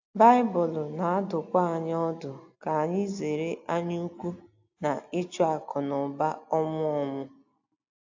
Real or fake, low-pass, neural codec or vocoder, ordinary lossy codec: real; 7.2 kHz; none; AAC, 48 kbps